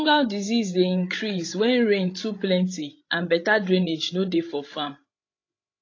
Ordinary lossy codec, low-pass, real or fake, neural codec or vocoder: AAC, 32 kbps; 7.2 kHz; fake; codec, 16 kHz, 16 kbps, FreqCodec, larger model